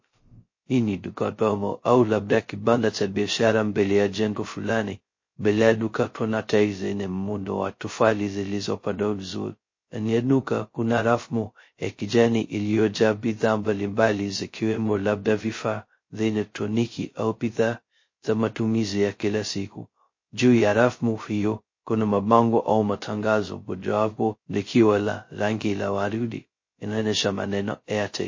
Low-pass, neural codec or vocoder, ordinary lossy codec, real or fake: 7.2 kHz; codec, 16 kHz, 0.2 kbps, FocalCodec; MP3, 32 kbps; fake